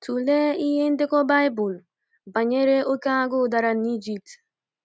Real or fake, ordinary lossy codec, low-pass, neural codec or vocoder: real; none; none; none